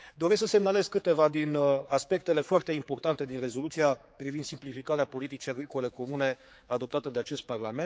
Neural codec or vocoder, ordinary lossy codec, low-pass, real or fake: codec, 16 kHz, 4 kbps, X-Codec, HuBERT features, trained on general audio; none; none; fake